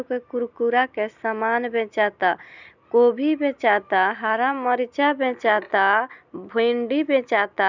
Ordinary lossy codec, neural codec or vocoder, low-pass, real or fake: none; none; 7.2 kHz; real